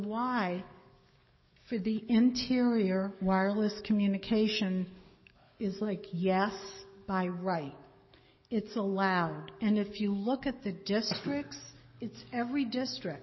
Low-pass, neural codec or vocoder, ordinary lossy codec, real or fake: 7.2 kHz; codec, 44.1 kHz, 7.8 kbps, DAC; MP3, 24 kbps; fake